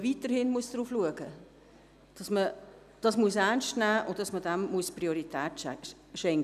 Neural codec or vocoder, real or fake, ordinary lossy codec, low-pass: none; real; none; 14.4 kHz